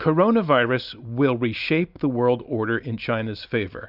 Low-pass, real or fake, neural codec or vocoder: 5.4 kHz; real; none